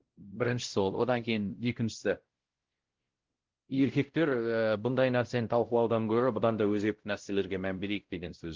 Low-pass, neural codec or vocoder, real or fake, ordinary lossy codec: 7.2 kHz; codec, 16 kHz, 0.5 kbps, X-Codec, WavLM features, trained on Multilingual LibriSpeech; fake; Opus, 16 kbps